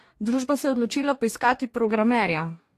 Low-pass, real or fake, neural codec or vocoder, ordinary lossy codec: 14.4 kHz; fake; codec, 44.1 kHz, 2.6 kbps, DAC; AAC, 64 kbps